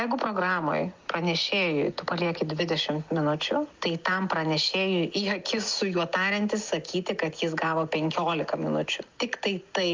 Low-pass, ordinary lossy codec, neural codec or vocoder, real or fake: 7.2 kHz; Opus, 24 kbps; none; real